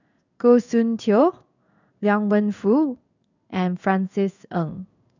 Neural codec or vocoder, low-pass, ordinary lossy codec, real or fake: codec, 16 kHz in and 24 kHz out, 1 kbps, XY-Tokenizer; 7.2 kHz; none; fake